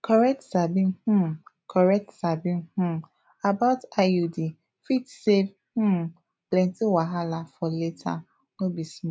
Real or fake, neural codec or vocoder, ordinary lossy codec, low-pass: real; none; none; none